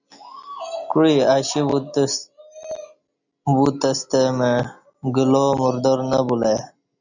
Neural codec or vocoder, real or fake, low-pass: none; real; 7.2 kHz